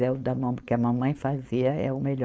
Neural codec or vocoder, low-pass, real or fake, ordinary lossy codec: codec, 16 kHz, 4.8 kbps, FACodec; none; fake; none